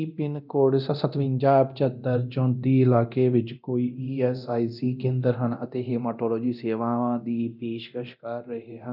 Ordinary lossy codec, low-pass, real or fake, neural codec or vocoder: none; 5.4 kHz; fake; codec, 24 kHz, 0.9 kbps, DualCodec